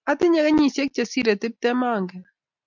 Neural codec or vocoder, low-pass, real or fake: none; 7.2 kHz; real